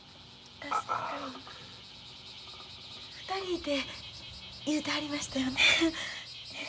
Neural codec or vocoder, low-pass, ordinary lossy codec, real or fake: none; none; none; real